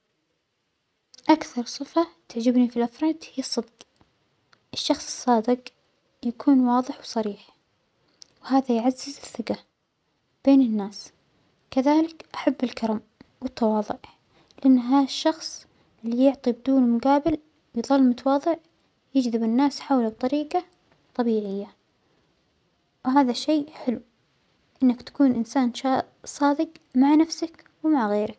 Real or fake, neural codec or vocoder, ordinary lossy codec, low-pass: real; none; none; none